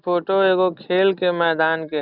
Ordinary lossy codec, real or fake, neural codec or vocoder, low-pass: Opus, 64 kbps; real; none; 5.4 kHz